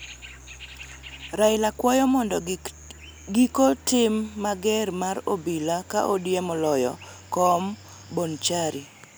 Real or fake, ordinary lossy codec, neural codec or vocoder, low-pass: real; none; none; none